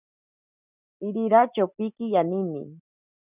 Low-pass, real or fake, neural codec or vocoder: 3.6 kHz; real; none